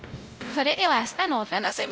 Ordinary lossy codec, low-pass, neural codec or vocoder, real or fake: none; none; codec, 16 kHz, 0.5 kbps, X-Codec, WavLM features, trained on Multilingual LibriSpeech; fake